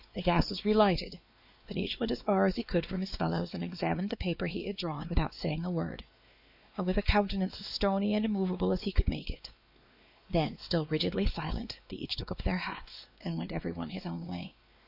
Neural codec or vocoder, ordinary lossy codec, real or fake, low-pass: codec, 16 kHz, 2 kbps, X-Codec, WavLM features, trained on Multilingual LibriSpeech; AAC, 48 kbps; fake; 5.4 kHz